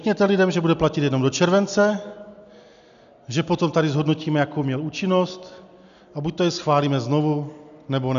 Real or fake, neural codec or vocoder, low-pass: real; none; 7.2 kHz